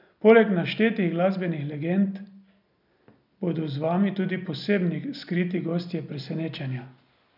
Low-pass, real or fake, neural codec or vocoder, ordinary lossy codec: 5.4 kHz; real; none; none